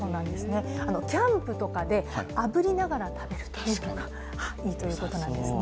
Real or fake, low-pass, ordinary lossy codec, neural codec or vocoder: real; none; none; none